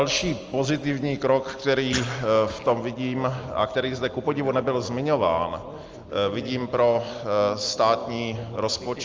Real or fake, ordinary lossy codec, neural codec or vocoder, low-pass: real; Opus, 24 kbps; none; 7.2 kHz